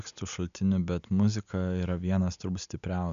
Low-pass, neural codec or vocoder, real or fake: 7.2 kHz; none; real